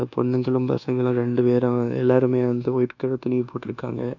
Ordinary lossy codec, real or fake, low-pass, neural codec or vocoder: none; fake; 7.2 kHz; codec, 24 kHz, 1.2 kbps, DualCodec